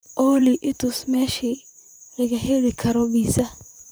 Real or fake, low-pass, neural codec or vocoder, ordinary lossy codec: fake; none; vocoder, 44.1 kHz, 128 mel bands, Pupu-Vocoder; none